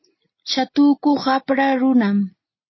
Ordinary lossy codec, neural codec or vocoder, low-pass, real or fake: MP3, 24 kbps; none; 7.2 kHz; real